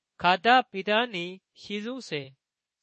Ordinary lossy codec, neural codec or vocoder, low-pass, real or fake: MP3, 32 kbps; codec, 24 kHz, 1.2 kbps, DualCodec; 10.8 kHz; fake